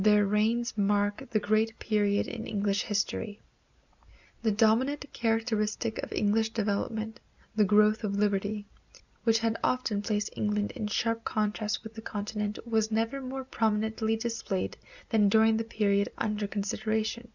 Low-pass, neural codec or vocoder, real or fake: 7.2 kHz; none; real